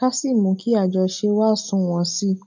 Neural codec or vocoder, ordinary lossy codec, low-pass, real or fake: none; none; 7.2 kHz; real